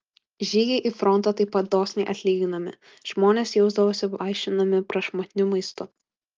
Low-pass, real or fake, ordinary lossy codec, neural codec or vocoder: 7.2 kHz; real; Opus, 24 kbps; none